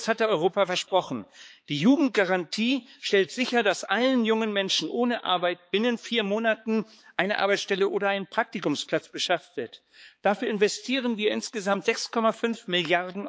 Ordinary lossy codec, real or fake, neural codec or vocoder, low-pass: none; fake; codec, 16 kHz, 4 kbps, X-Codec, HuBERT features, trained on balanced general audio; none